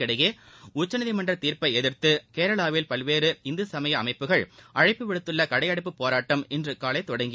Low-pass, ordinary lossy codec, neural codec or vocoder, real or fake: none; none; none; real